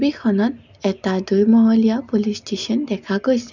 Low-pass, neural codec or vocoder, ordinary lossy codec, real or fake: 7.2 kHz; none; none; real